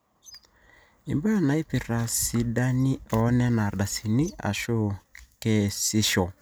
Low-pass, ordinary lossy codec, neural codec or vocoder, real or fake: none; none; none; real